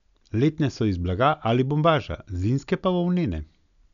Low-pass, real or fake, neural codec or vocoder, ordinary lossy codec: 7.2 kHz; real; none; none